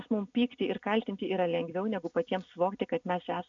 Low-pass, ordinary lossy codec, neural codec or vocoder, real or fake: 7.2 kHz; MP3, 64 kbps; none; real